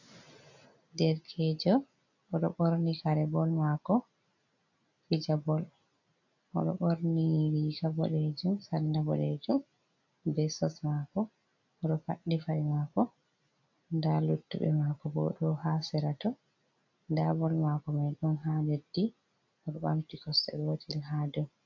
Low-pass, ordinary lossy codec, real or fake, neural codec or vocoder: 7.2 kHz; AAC, 48 kbps; real; none